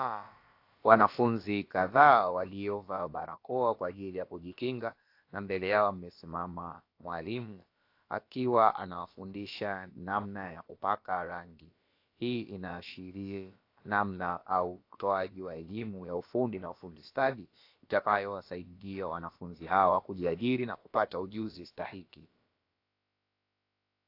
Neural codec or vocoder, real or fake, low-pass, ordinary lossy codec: codec, 16 kHz, about 1 kbps, DyCAST, with the encoder's durations; fake; 5.4 kHz; AAC, 32 kbps